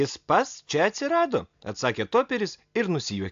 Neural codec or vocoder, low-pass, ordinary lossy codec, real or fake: none; 7.2 kHz; AAC, 64 kbps; real